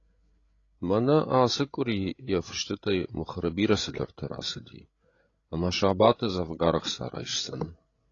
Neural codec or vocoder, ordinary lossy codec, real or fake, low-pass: codec, 16 kHz, 8 kbps, FreqCodec, larger model; AAC, 32 kbps; fake; 7.2 kHz